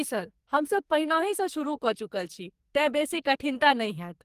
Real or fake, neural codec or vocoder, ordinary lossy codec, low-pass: fake; codec, 44.1 kHz, 2.6 kbps, SNAC; Opus, 24 kbps; 14.4 kHz